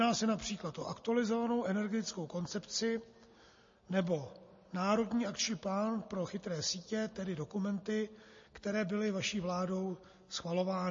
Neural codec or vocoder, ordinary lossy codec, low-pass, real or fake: none; MP3, 32 kbps; 7.2 kHz; real